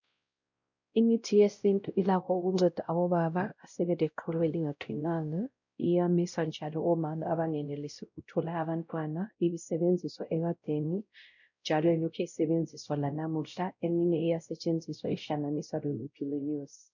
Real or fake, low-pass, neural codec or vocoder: fake; 7.2 kHz; codec, 16 kHz, 0.5 kbps, X-Codec, WavLM features, trained on Multilingual LibriSpeech